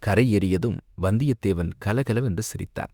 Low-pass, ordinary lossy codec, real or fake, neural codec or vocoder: 19.8 kHz; Opus, 64 kbps; fake; autoencoder, 48 kHz, 32 numbers a frame, DAC-VAE, trained on Japanese speech